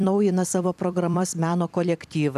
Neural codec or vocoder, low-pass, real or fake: vocoder, 44.1 kHz, 128 mel bands every 256 samples, BigVGAN v2; 14.4 kHz; fake